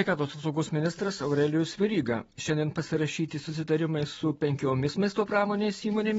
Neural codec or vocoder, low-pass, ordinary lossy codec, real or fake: none; 19.8 kHz; AAC, 24 kbps; real